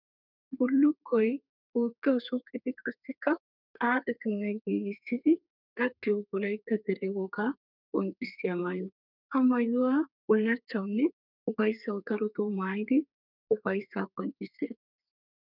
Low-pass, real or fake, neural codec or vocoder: 5.4 kHz; fake; codec, 32 kHz, 1.9 kbps, SNAC